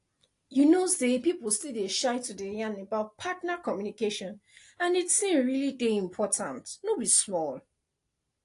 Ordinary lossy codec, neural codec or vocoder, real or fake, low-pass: AAC, 48 kbps; none; real; 10.8 kHz